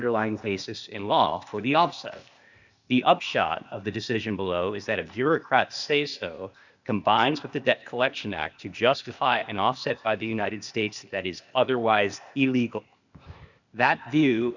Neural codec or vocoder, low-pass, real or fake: codec, 16 kHz, 0.8 kbps, ZipCodec; 7.2 kHz; fake